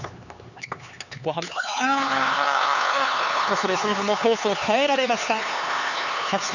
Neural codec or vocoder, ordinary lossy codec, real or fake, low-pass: codec, 16 kHz, 4 kbps, X-Codec, HuBERT features, trained on LibriSpeech; none; fake; 7.2 kHz